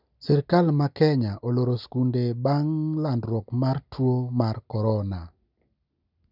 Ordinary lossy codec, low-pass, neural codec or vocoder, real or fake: none; 5.4 kHz; none; real